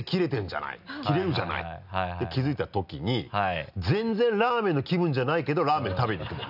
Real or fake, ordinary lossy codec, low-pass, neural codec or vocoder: real; none; 5.4 kHz; none